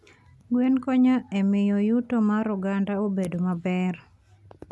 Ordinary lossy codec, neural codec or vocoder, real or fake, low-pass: none; none; real; none